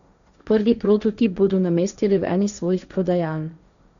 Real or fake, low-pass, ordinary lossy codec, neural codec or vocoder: fake; 7.2 kHz; none; codec, 16 kHz, 1.1 kbps, Voila-Tokenizer